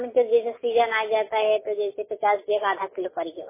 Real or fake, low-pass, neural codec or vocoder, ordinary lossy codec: real; 3.6 kHz; none; MP3, 16 kbps